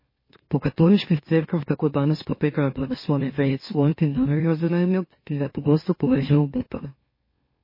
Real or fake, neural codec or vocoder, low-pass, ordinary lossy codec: fake; autoencoder, 44.1 kHz, a latent of 192 numbers a frame, MeloTTS; 5.4 kHz; MP3, 24 kbps